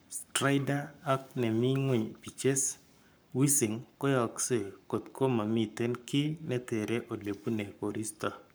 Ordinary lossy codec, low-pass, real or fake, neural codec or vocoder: none; none; fake; codec, 44.1 kHz, 7.8 kbps, Pupu-Codec